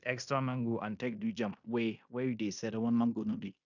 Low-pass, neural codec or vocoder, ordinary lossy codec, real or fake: 7.2 kHz; codec, 16 kHz in and 24 kHz out, 0.9 kbps, LongCat-Audio-Codec, fine tuned four codebook decoder; none; fake